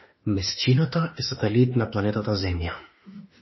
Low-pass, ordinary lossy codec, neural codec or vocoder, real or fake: 7.2 kHz; MP3, 24 kbps; autoencoder, 48 kHz, 32 numbers a frame, DAC-VAE, trained on Japanese speech; fake